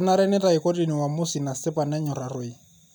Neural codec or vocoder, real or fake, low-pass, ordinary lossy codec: none; real; none; none